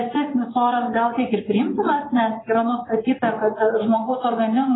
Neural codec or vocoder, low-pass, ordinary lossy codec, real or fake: vocoder, 24 kHz, 100 mel bands, Vocos; 7.2 kHz; AAC, 16 kbps; fake